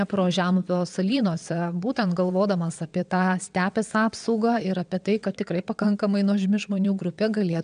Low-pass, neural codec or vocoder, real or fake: 9.9 kHz; vocoder, 22.05 kHz, 80 mel bands, WaveNeXt; fake